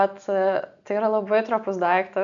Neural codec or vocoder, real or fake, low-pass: none; real; 7.2 kHz